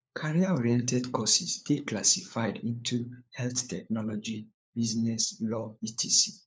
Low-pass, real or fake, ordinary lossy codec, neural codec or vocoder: none; fake; none; codec, 16 kHz, 4 kbps, FunCodec, trained on LibriTTS, 50 frames a second